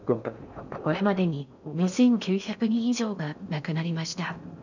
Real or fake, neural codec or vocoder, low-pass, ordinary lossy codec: fake; codec, 16 kHz in and 24 kHz out, 0.6 kbps, FocalCodec, streaming, 4096 codes; 7.2 kHz; none